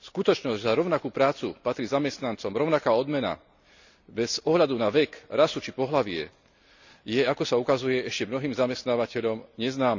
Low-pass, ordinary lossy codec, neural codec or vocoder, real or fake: 7.2 kHz; none; none; real